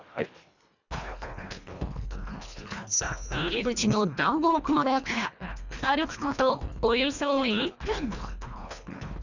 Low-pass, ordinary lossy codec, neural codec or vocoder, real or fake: 7.2 kHz; none; codec, 24 kHz, 1.5 kbps, HILCodec; fake